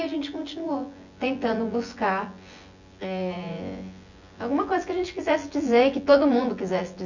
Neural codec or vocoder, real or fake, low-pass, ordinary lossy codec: vocoder, 24 kHz, 100 mel bands, Vocos; fake; 7.2 kHz; none